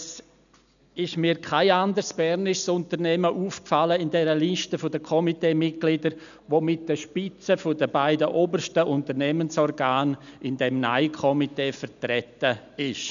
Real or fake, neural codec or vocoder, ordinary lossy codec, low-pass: real; none; none; 7.2 kHz